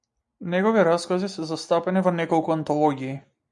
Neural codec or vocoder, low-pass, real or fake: none; 10.8 kHz; real